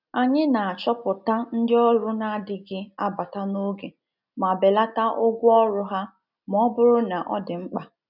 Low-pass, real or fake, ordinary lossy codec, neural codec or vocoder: 5.4 kHz; real; none; none